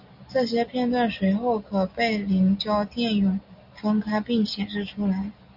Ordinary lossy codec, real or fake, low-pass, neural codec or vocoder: Opus, 64 kbps; real; 5.4 kHz; none